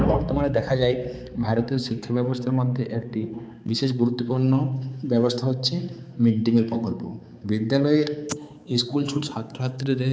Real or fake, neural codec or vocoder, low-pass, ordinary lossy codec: fake; codec, 16 kHz, 4 kbps, X-Codec, HuBERT features, trained on balanced general audio; none; none